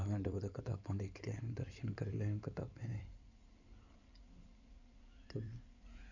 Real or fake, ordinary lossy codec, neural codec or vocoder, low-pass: fake; none; codec, 16 kHz in and 24 kHz out, 2.2 kbps, FireRedTTS-2 codec; 7.2 kHz